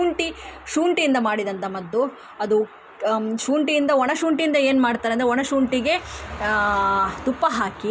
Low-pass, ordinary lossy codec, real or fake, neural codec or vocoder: none; none; real; none